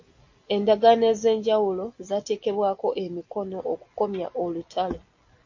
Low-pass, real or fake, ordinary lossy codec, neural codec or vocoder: 7.2 kHz; real; AAC, 48 kbps; none